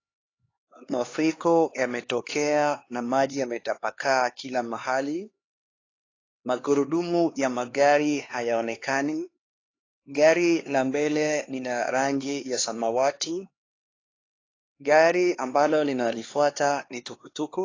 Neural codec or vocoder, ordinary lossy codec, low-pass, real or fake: codec, 16 kHz, 2 kbps, X-Codec, HuBERT features, trained on LibriSpeech; AAC, 32 kbps; 7.2 kHz; fake